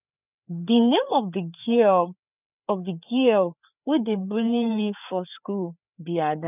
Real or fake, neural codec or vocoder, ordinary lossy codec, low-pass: fake; codec, 16 kHz, 4 kbps, FreqCodec, larger model; none; 3.6 kHz